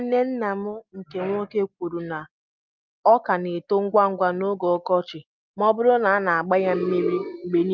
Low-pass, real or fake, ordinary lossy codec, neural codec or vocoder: 7.2 kHz; real; Opus, 24 kbps; none